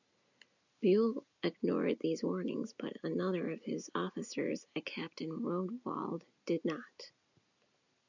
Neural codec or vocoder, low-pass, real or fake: none; 7.2 kHz; real